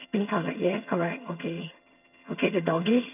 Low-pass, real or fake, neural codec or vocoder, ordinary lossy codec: 3.6 kHz; fake; vocoder, 22.05 kHz, 80 mel bands, HiFi-GAN; none